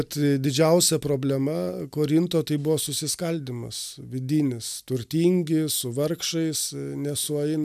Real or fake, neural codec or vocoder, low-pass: real; none; 14.4 kHz